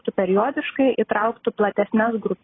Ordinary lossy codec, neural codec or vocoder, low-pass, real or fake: AAC, 16 kbps; none; 7.2 kHz; real